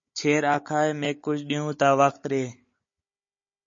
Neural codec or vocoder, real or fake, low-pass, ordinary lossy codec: codec, 16 kHz, 16 kbps, FunCodec, trained on Chinese and English, 50 frames a second; fake; 7.2 kHz; MP3, 32 kbps